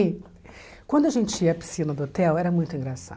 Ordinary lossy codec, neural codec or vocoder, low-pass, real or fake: none; none; none; real